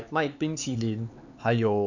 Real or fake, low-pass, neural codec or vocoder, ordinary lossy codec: fake; 7.2 kHz; codec, 16 kHz, 4 kbps, X-Codec, HuBERT features, trained on LibriSpeech; none